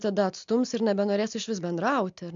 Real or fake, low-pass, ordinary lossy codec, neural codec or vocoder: real; 7.2 kHz; MP3, 64 kbps; none